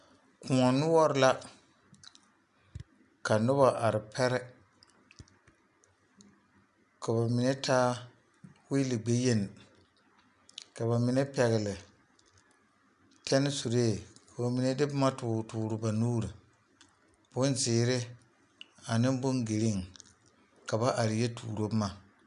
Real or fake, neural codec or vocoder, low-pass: real; none; 10.8 kHz